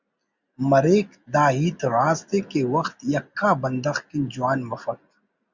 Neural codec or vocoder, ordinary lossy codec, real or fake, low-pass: none; Opus, 64 kbps; real; 7.2 kHz